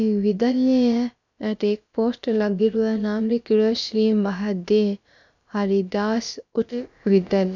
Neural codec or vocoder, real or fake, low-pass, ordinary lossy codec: codec, 16 kHz, about 1 kbps, DyCAST, with the encoder's durations; fake; 7.2 kHz; none